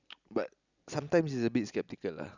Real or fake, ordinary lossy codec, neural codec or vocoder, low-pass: real; none; none; 7.2 kHz